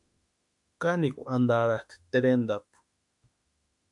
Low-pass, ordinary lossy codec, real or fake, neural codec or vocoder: 10.8 kHz; MP3, 64 kbps; fake; autoencoder, 48 kHz, 32 numbers a frame, DAC-VAE, trained on Japanese speech